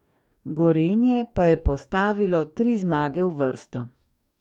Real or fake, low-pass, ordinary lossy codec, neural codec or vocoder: fake; 19.8 kHz; none; codec, 44.1 kHz, 2.6 kbps, DAC